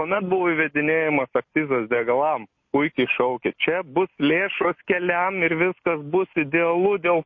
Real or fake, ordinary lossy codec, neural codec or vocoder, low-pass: real; MP3, 32 kbps; none; 7.2 kHz